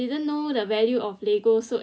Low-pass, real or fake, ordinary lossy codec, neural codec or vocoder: none; real; none; none